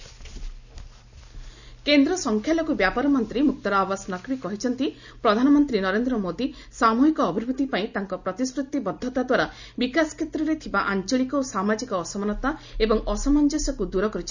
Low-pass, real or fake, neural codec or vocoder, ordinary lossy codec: 7.2 kHz; real; none; none